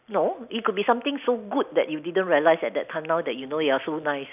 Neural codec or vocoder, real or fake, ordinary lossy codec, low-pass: none; real; none; 3.6 kHz